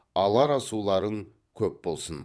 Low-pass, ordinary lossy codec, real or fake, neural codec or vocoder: none; none; fake; vocoder, 22.05 kHz, 80 mel bands, WaveNeXt